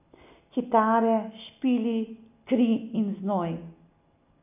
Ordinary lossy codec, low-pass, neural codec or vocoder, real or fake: none; 3.6 kHz; none; real